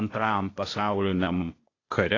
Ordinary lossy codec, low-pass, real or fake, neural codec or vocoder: AAC, 32 kbps; 7.2 kHz; fake; codec, 16 kHz, 0.8 kbps, ZipCodec